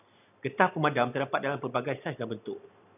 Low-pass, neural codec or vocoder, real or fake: 3.6 kHz; none; real